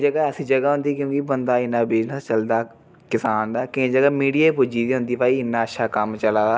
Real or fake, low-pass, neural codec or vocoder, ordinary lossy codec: real; none; none; none